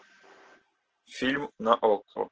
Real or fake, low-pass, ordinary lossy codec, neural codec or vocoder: real; 7.2 kHz; Opus, 16 kbps; none